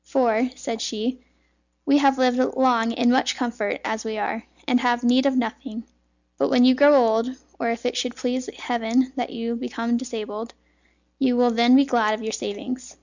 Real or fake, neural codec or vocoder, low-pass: real; none; 7.2 kHz